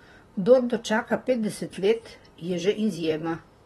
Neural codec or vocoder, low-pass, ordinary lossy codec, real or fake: vocoder, 44.1 kHz, 128 mel bands, Pupu-Vocoder; 19.8 kHz; AAC, 32 kbps; fake